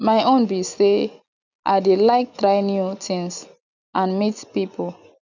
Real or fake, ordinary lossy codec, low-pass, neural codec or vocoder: real; none; 7.2 kHz; none